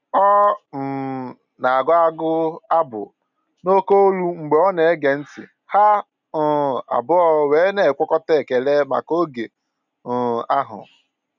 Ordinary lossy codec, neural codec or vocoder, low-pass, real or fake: none; none; 7.2 kHz; real